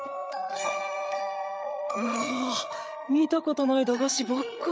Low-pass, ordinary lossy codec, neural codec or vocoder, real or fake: none; none; codec, 16 kHz, 8 kbps, FreqCodec, larger model; fake